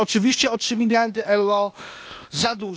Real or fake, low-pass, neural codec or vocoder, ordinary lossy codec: fake; none; codec, 16 kHz, 0.8 kbps, ZipCodec; none